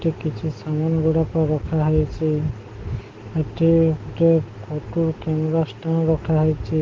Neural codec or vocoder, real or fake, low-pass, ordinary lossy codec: none; real; 7.2 kHz; Opus, 16 kbps